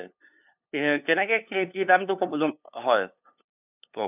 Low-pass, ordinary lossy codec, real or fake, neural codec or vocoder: 3.6 kHz; none; fake; codec, 16 kHz, 2 kbps, FunCodec, trained on LibriTTS, 25 frames a second